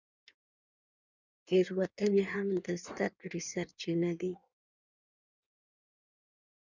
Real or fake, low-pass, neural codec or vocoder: fake; 7.2 kHz; codec, 16 kHz in and 24 kHz out, 1.1 kbps, FireRedTTS-2 codec